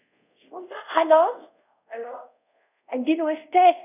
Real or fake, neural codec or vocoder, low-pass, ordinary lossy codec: fake; codec, 24 kHz, 0.5 kbps, DualCodec; 3.6 kHz; none